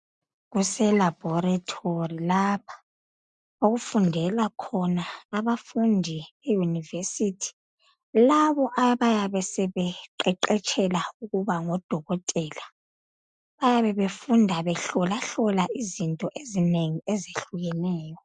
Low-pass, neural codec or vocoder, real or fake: 9.9 kHz; none; real